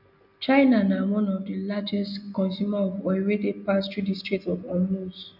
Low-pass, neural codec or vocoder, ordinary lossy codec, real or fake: 5.4 kHz; none; none; real